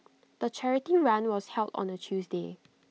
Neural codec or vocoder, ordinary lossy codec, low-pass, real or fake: none; none; none; real